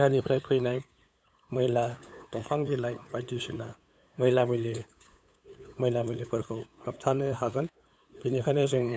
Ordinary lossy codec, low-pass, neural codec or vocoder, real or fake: none; none; codec, 16 kHz, 8 kbps, FunCodec, trained on LibriTTS, 25 frames a second; fake